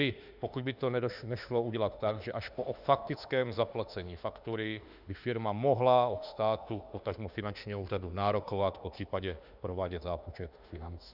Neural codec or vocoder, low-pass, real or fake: autoencoder, 48 kHz, 32 numbers a frame, DAC-VAE, trained on Japanese speech; 5.4 kHz; fake